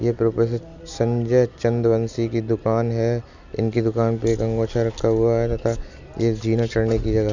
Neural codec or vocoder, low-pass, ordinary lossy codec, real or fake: none; 7.2 kHz; none; real